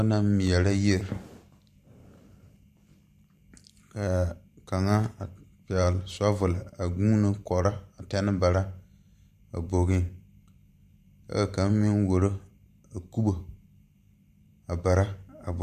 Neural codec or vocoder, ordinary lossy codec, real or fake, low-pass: vocoder, 44.1 kHz, 128 mel bands every 512 samples, BigVGAN v2; MP3, 96 kbps; fake; 14.4 kHz